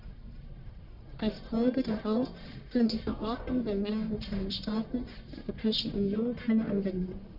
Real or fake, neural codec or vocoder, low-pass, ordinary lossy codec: fake; codec, 44.1 kHz, 1.7 kbps, Pupu-Codec; 5.4 kHz; none